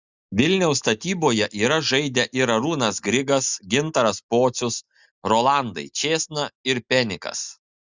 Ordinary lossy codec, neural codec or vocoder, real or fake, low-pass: Opus, 64 kbps; none; real; 7.2 kHz